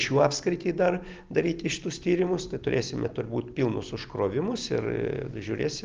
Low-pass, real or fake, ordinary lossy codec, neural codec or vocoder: 7.2 kHz; real; Opus, 32 kbps; none